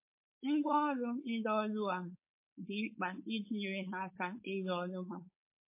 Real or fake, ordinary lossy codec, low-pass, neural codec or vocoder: fake; MP3, 24 kbps; 3.6 kHz; codec, 16 kHz, 4.8 kbps, FACodec